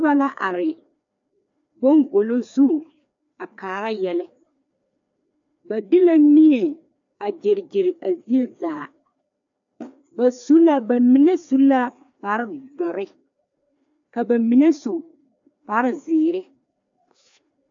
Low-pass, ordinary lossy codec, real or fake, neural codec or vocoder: 7.2 kHz; AAC, 64 kbps; fake; codec, 16 kHz, 2 kbps, FreqCodec, larger model